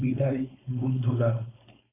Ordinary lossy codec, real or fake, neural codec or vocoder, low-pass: AAC, 16 kbps; fake; codec, 16 kHz, 4.8 kbps, FACodec; 3.6 kHz